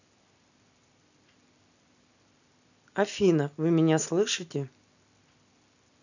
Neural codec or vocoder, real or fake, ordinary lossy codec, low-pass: none; real; none; 7.2 kHz